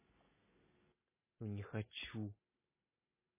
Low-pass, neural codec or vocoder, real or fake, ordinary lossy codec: 3.6 kHz; none; real; MP3, 16 kbps